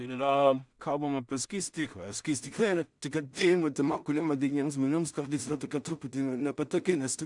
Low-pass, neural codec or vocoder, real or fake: 10.8 kHz; codec, 16 kHz in and 24 kHz out, 0.4 kbps, LongCat-Audio-Codec, two codebook decoder; fake